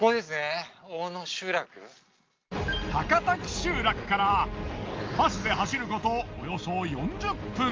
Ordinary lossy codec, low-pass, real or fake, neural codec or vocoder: Opus, 32 kbps; 7.2 kHz; real; none